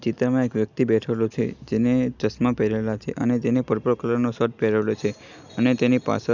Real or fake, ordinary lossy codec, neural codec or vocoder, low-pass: real; none; none; 7.2 kHz